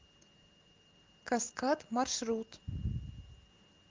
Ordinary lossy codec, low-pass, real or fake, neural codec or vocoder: Opus, 16 kbps; 7.2 kHz; real; none